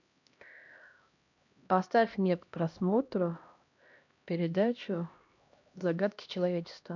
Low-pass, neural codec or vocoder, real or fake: 7.2 kHz; codec, 16 kHz, 1 kbps, X-Codec, HuBERT features, trained on LibriSpeech; fake